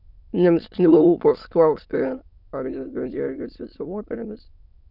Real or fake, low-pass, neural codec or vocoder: fake; 5.4 kHz; autoencoder, 22.05 kHz, a latent of 192 numbers a frame, VITS, trained on many speakers